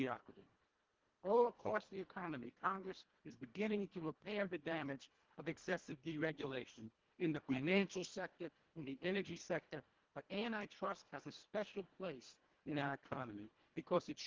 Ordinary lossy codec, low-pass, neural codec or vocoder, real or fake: Opus, 16 kbps; 7.2 kHz; codec, 24 kHz, 1.5 kbps, HILCodec; fake